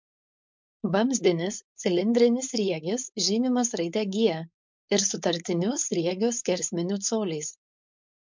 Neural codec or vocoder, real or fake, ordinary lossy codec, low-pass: codec, 16 kHz, 4.8 kbps, FACodec; fake; MP3, 64 kbps; 7.2 kHz